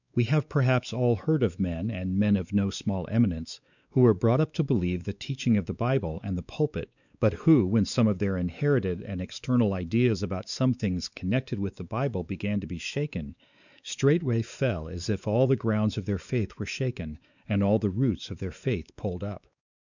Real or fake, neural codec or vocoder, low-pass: fake; codec, 16 kHz, 4 kbps, X-Codec, WavLM features, trained on Multilingual LibriSpeech; 7.2 kHz